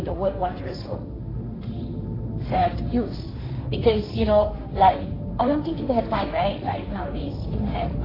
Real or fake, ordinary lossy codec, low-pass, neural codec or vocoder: fake; AAC, 24 kbps; 5.4 kHz; codec, 16 kHz, 1.1 kbps, Voila-Tokenizer